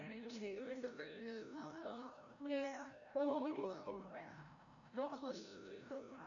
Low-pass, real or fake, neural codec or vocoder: 7.2 kHz; fake; codec, 16 kHz, 0.5 kbps, FreqCodec, larger model